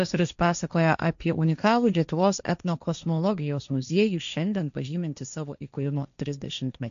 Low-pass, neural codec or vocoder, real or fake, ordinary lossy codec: 7.2 kHz; codec, 16 kHz, 1.1 kbps, Voila-Tokenizer; fake; AAC, 96 kbps